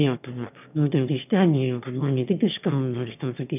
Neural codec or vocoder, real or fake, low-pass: autoencoder, 22.05 kHz, a latent of 192 numbers a frame, VITS, trained on one speaker; fake; 3.6 kHz